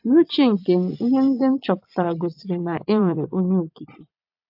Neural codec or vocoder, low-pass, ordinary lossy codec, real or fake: vocoder, 22.05 kHz, 80 mel bands, Vocos; 5.4 kHz; AAC, 48 kbps; fake